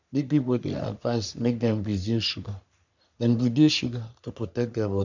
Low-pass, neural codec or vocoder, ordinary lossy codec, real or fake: 7.2 kHz; codec, 44.1 kHz, 3.4 kbps, Pupu-Codec; none; fake